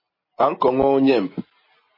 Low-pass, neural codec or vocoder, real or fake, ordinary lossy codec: 5.4 kHz; none; real; MP3, 24 kbps